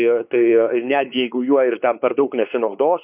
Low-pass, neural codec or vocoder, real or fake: 3.6 kHz; codec, 16 kHz, 4 kbps, X-Codec, WavLM features, trained on Multilingual LibriSpeech; fake